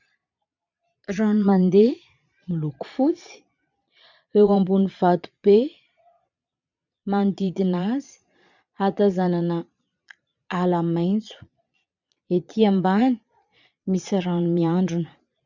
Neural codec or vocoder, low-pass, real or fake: vocoder, 22.05 kHz, 80 mel bands, Vocos; 7.2 kHz; fake